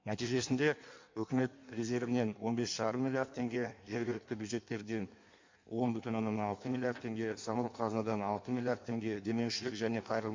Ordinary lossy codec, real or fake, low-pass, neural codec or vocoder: MP3, 48 kbps; fake; 7.2 kHz; codec, 16 kHz in and 24 kHz out, 1.1 kbps, FireRedTTS-2 codec